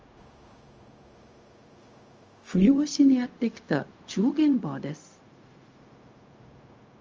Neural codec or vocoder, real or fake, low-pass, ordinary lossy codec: codec, 16 kHz, 0.4 kbps, LongCat-Audio-Codec; fake; 7.2 kHz; Opus, 24 kbps